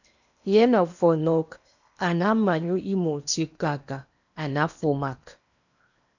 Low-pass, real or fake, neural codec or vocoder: 7.2 kHz; fake; codec, 16 kHz in and 24 kHz out, 0.8 kbps, FocalCodec, streaming, 65536 codes